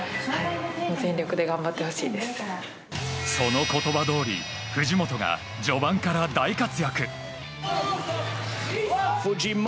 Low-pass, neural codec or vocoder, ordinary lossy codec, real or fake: none; none; none; real